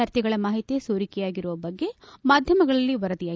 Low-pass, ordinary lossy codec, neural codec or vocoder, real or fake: none; none; none; real